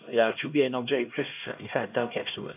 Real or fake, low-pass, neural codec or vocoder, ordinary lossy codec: fake; 3.6 kHz; codec, 16 kHz, 1 kbps, X-Codec, HuBERT features, trained on LibriSpeech; AAC, 32 kbps